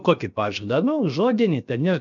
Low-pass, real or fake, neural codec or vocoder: 7.2 kHz; fake; codec, 16 kHz, 0.7 kbps, FocalCodec